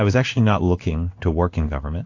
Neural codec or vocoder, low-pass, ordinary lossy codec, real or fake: codec, 16 kHz in and 24 kHz out, 1 kbps, XY-Tokenizer; 7.2 kHz; AAC, 48 kbps; fake